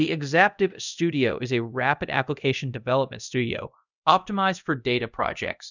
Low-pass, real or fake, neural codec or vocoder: 7.2 kHz; fake; codec, 24 kHz, 0.5 kbps, DualCodec